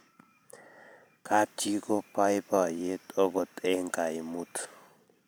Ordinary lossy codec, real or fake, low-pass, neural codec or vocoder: none; fake; none; vocoder, 44.1 kHz, 128 mel bands every 512 samples, BigVGAN v2